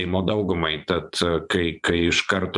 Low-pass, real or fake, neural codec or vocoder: 10.8 kHz; fake; vocoder, 24 kHz, 100 mel bands, Vocos